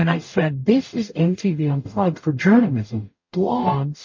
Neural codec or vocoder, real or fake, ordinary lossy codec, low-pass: codec, 44.1 kHz, 0.9 kbps, DAC; fake; MP3, 32 kbps; 7.2 kHz